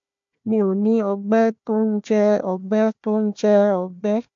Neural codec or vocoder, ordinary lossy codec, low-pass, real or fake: codec, 16 kHz, 1 kbps, FunCodec, trained on Chinese and English, 50 frames a second; AAC, 64 kbps; 7.2 kHz; fake